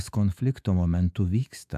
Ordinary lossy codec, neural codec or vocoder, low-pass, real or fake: MP3, 96 kbps; autoencoder, 48 kHz, 128 numbers a frame, DAC-VAE, trained on Japanese speech; 14.4 kHz; fake